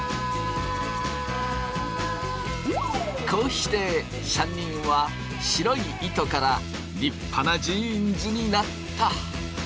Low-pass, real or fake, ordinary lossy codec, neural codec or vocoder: none; real; none; none